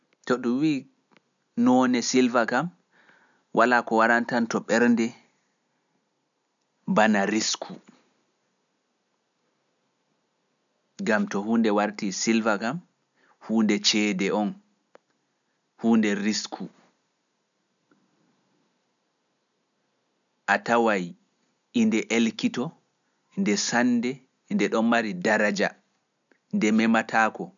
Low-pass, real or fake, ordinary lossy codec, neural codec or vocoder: 7.2 kHz; real; none; none